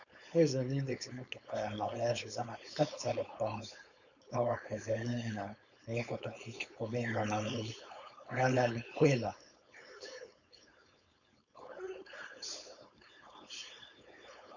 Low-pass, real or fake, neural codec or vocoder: 7.2 kHz; fake; codec, 16 kHz, 4.8 kbps, FACodec